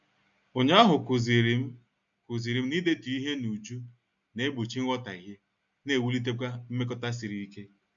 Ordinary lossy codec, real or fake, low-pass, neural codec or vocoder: MP3, 64 kbps; real; 7.2 kHz; none